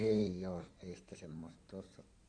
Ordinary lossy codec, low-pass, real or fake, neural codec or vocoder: none; 9.9 kHz; fake; codec, 16 kHz in and 24 kHz out, 2.2 kbps, FireRedTTS-2 codec